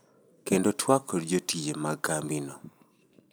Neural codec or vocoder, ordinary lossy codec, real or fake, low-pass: none; none; real; none